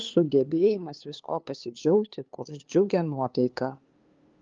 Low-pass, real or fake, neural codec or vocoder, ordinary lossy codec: 7.2 kHz; fake; codec, 16 kHz, 2 kbps, FunCodec, trained on LibriTTS, 25 frames a second; Opus, 32 kbps